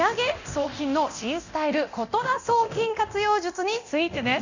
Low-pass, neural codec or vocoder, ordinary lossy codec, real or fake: 7.2 kHz; codec, 24 kHz, 0.9 kbps, DualCodec; none; fake